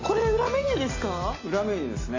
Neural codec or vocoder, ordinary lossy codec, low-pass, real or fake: none; AAC, 32 kbps; 7.2 kHz; real